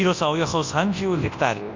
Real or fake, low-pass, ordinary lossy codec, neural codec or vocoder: fake; 7.2 kHz; MP3, 48 kbps; codec, 24 kHz, 0.9 kbps, WavTokenizer, large speech release